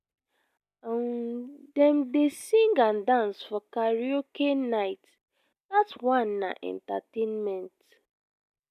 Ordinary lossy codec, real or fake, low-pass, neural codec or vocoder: none; real; 14.4 kHz; none